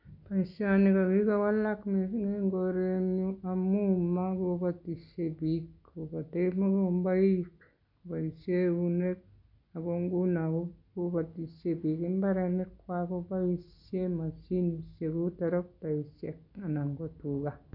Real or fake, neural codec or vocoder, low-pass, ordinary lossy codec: real; none; 5.4 kHz; none